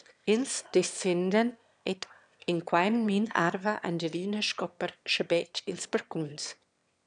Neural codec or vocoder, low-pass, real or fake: autoencoder, 22.05 kHz, a latent of 192 numbers a frame, VITS, trained on one speaker; 9.9 kHz; fake